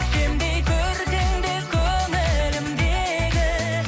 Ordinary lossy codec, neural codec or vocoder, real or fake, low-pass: none; none; real; none